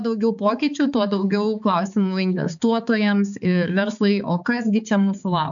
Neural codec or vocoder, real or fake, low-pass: codec, 16 kHz, 4 kbps, X-Codec, HuBERT features, trained on balanced general audio; fake; 7.2 kHz